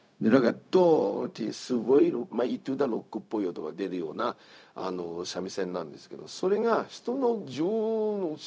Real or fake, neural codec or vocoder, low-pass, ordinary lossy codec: fake; codec, 16 kHz, 0.4 kbps, LongCat-Audio-Codec; none; none